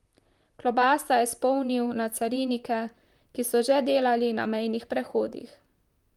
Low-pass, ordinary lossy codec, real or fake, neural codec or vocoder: 19.8 kHz; Opus, 32 kbps; fake; vocoder, 44.1 kHz, 128 mel bands, Pupu-Vocoder